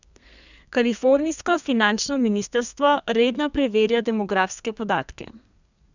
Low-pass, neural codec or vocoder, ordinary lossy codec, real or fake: 7.2 kHz; codec, 32 kHz, 1.9 kbps, SNAC; none; fake